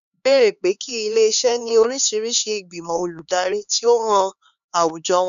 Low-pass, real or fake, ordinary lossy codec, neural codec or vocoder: 7.2 kHz; fake; none; codec, 16 kHz, 4 kbps, X-Codec, HuBERT features, trained on LibriSpeech